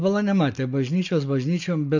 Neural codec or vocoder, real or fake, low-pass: none; real; 7.2 kHz